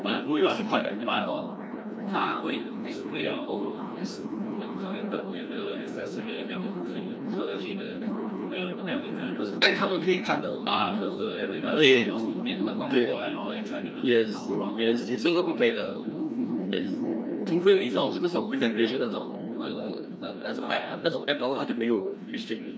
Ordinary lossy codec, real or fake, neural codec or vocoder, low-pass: none; fake; codec, 16 kHz, 1 kbps, FreqCodec, larger model; none